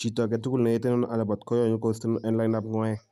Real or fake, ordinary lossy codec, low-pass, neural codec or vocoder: real; none; 14.4 kHz; none